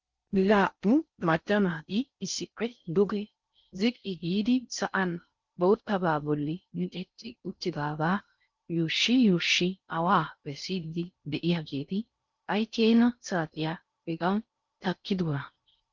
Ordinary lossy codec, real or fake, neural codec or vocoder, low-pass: Opus, 24 kbps; fake; codec, 16 kHz in and 24 kHz out, 0.6 kbps, FocalCodec, streaming, 4096 codes; 7.2 kHz